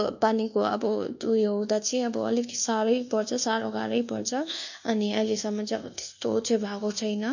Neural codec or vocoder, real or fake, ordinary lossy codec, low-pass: codec, 24 kHz, 1.2 kbps, DualCodec; fake; none; 7.2 kHz